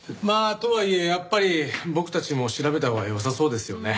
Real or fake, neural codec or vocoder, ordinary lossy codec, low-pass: real; none; none; none